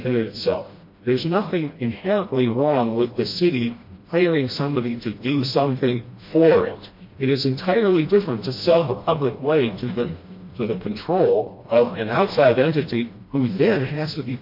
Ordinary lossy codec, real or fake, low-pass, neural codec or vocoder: MP3, 32 kbps; fake; 5.4 kHz; codec, 16 kHz, 1 kbps, FreqCodec, smaller model